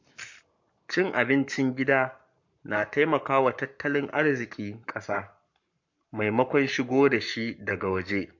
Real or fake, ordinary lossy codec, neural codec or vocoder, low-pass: fake; MP3, 48 kbps; codec, 44.1 kHz, 7.8 kbps, Pupu-Codec; 7.2 kHz